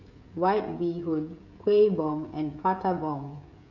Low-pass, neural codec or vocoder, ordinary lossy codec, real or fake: 7.2 kHz; codec, 16 kHz, 16 kbps, FreqCodec, smaller model; none; fake